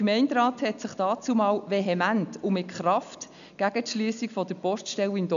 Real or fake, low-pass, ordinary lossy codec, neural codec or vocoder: real; 7.2 kHz; none; none